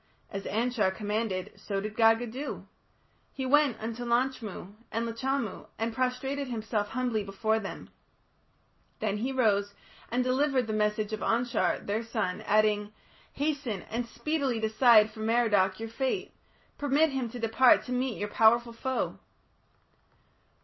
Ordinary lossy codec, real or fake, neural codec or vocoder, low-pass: MP3, 24 kbps; real; none; 7.2 kHz